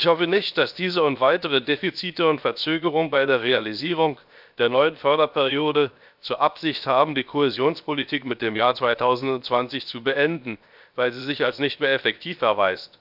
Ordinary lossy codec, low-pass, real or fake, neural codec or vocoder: none; 5.4 kHz; fake; codec, 16 kHz, 0.7 kbps, FocalCodec